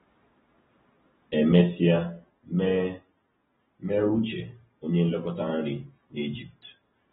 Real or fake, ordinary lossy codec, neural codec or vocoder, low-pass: real; AAC, 16 kbps; none; 7.2 kHz